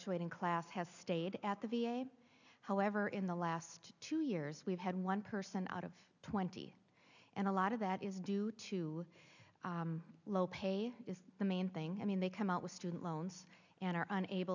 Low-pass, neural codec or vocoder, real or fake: 7.2 kHz; none; real